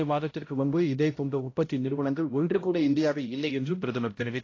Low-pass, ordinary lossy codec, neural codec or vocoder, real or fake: 7.2 kHz; AAC, 32 kbps; codec, 16 kHz, 0.5 kbps, X-Codec, HuBERT features, trained on balanced general audio; fake